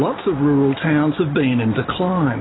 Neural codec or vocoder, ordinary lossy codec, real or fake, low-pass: none; AAC, 16 kbps; real; 7.2 kHz